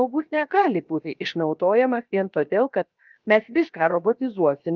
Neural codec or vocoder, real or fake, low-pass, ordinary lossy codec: codec, 16 kHz, 0.7 kbps, FocalCodec; fake; 7.2 kHz; Opus, 24 kbps